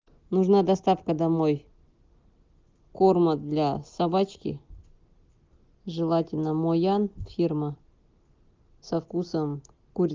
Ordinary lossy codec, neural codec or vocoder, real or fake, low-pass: Opus, 32 kbps; none; real; 7.2 kHz